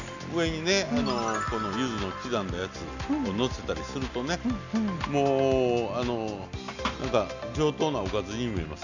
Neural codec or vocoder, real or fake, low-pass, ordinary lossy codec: none; real; 7.2 kHz; none